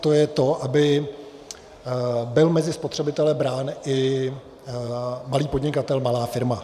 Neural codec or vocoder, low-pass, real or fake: vocoder, 44.1 kHz, 128 mel bands every 512 samples, BigVGAN v2; 14.4 kHz; fake